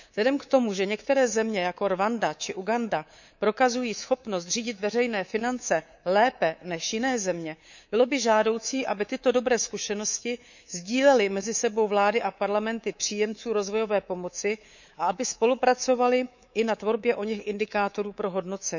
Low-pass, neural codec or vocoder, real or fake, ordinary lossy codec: 7.2 kHz; codec, 24 kHz, 3.1 kbps, DualCodec; fake; none